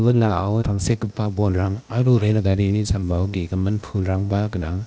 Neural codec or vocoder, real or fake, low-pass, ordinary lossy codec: codec, 16 kHz, 0.8 kbps, ZipCodec; fake; none; none